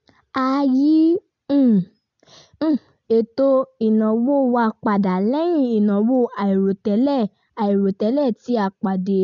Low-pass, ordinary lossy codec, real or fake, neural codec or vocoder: 7.2 kHz; none; real; none